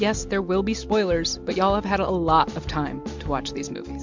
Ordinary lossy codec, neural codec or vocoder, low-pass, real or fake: MP3, 64 kbps; none; 7.2 kHz; real